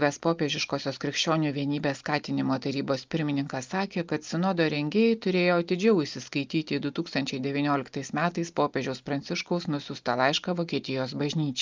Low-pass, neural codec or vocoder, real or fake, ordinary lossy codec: 7.2 kHz; none; real; Opus, 24 kbps